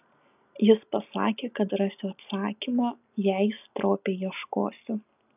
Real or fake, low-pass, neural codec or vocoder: real; 3.6 kHz; none